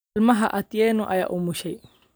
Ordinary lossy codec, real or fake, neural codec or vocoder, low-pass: none; real; none; none